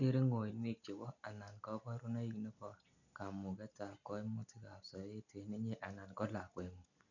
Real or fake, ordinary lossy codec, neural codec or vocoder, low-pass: real; none; none; 7.2 kHz